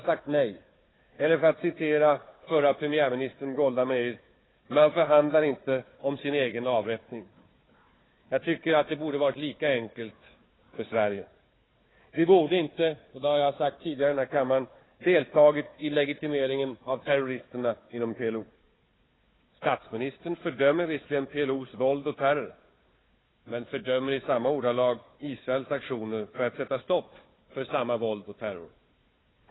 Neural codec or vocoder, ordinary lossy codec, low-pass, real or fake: codec, 16 kHz, 4 kbps, FunCodec, trained on Chinese and English, 50 frames a second; AAC, 16 kbps; 7.2 kHz; fake